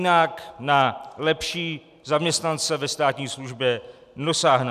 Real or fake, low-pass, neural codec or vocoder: real; 14.4 kHz; none